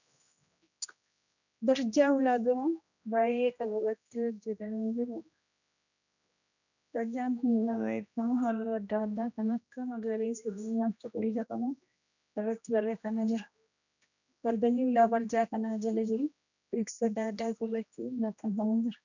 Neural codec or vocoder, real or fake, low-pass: codec, 16 kHz, 1 kbps, X-Codec, HuBERT features, trained on general audio; fake; 7.2 kHz